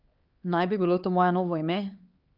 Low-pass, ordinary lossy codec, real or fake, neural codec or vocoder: 5.4 kHz; Opus, 32 kbps; fake; codec, 16 kHz, 4 kbps, X-Codec, HuBERT features, trained on LibriSpeech